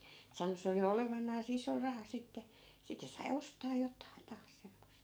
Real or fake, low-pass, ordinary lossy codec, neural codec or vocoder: fake; none; none; codec, 44.1 kHz, 7.8 kbps, DAC